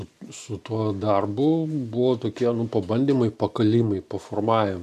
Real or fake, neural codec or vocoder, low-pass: real; none; 14.4 kHz